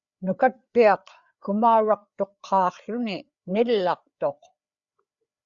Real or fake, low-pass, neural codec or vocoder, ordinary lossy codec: fake; 7.2 kHz; codec, 16 kHz, 4 kbps, FreqCodec, larger model; Opus, 64 kbps